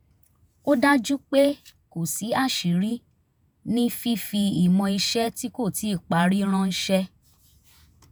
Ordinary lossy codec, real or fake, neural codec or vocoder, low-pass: none; fake; vocoder, 48 kHz, 128 mel bands, Vocos; none